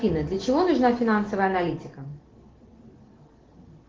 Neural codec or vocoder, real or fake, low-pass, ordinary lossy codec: none; real; 7.2 kHz; Opus, 16 kbps